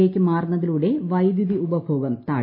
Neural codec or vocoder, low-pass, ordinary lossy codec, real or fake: none; 5.4 kHz; MP3, 24 kbps; real